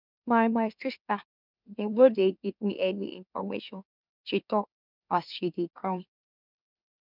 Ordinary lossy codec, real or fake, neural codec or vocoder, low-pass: none; fake; autoencoder, 44.1 kHz, a latent of 192 numbers a frame, MeloTTS; 5.4 kHz